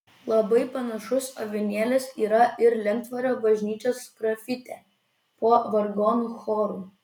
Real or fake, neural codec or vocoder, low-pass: real; none; 19.8 kHz